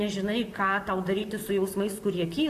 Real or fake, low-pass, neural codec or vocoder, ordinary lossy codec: fake; 14.4 kHz; vocoder, 44.1 kHz, 128 mel bands, Pupu-Vocoder; AAC, 64 kbps